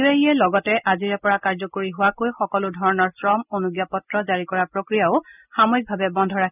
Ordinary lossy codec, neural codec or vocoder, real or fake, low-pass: none; none; real; 3.6 kHz